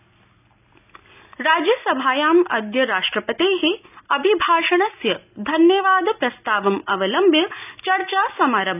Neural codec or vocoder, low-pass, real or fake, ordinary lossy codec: none; 3.6 kHz; real; none